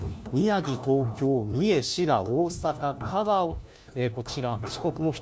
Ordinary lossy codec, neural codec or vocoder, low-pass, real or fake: none; codec, 16 kHz, 1 kbps, FunCodec, trained on LibriTTS, 50 frames a second; none; fake